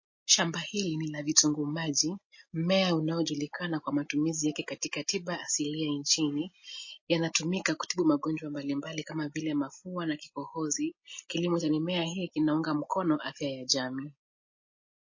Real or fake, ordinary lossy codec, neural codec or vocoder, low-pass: real; MP3, 32 kbps; none; 7.2 kHz